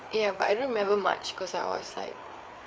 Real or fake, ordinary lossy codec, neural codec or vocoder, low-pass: fake; none; codec, 16 kHz, 4 kbps, FreqCodec, larger model; none